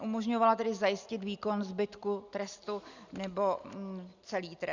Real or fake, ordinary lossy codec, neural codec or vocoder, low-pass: real; Opus, 64 kbps; none; 7.2 kHz